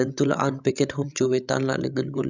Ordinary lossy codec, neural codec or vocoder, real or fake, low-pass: none; codec, 16 kHz, 16 kbps, FreqCodec, larger model; fake; 7.2 kHz